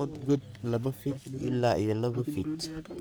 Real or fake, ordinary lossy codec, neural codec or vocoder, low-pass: fake; none; codec, 44.1 kHz, 3.4 kbps, Pupu-Codec; none